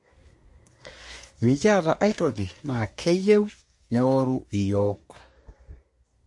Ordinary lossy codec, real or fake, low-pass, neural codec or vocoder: MP3, 48 kbps; fake; 10.8 kHz; codec, 24 kHz, 1 kbps, SNAC